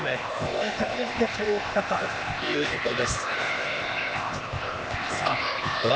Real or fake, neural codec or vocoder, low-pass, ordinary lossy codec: fake; codec, 16 kHz, 0.8 kbps, ZipCodec; none; none